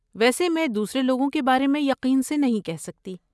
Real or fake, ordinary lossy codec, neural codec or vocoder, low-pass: real; none; none; none